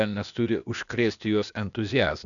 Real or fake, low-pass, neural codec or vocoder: fake; 7.2 kHz; codec, 16 kHz, 0.8 kbps, ZipCodec